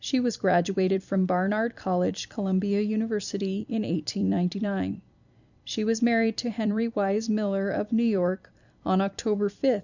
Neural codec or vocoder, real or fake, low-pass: vocoder, 44.1 kHz, 128 mel bands every 256 samples, BigVGAN v2; fake; 7.2 kHz